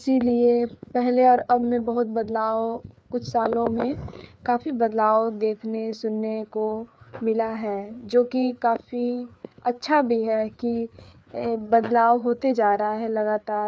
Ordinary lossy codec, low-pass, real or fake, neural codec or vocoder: none; none; fake; codec, 16 kHz, 4 kbps, FreqCodec, larger model